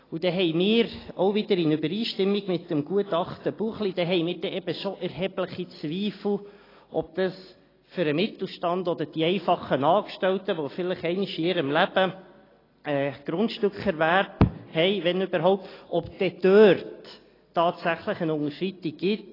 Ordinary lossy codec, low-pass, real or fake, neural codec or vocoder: AAC, 24 kbps; 5.4 kHz; real; none